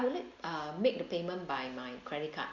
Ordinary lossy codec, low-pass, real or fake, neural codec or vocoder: Opus, 64 kbps; 7.2 kHz; real; none